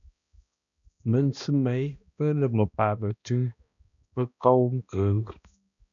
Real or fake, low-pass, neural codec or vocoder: fake; 7.2 kHz; codec, 16 kHz, 1 kbps, X-Codec, HuBERT features, trained on balanced general audio